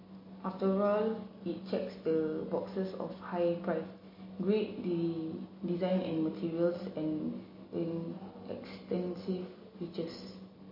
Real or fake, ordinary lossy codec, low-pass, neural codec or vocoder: real; MP3, 24 kbps; 5.4 kHz; none